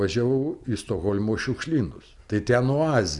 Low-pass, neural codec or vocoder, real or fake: 10.8 kHz; none; real